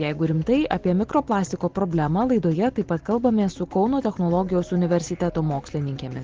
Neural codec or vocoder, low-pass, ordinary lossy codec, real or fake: none; 7.2 kHz; Opus, 16 kbps; real